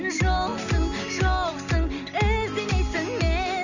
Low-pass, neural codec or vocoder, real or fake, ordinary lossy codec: 7.2 kHz; none; real; none